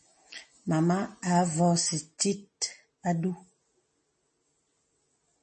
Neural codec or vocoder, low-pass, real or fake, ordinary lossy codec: none; 10.8 kHz; real; MP3, 32 kbps